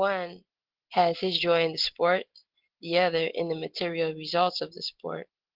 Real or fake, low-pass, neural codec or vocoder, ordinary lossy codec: real; 5.4 kHz; none; Opus, 16 kbps